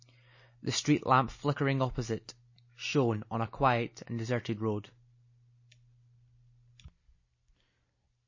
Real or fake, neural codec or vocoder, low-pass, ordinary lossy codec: real; none; 7.2 kHz; MP3, 32 kbps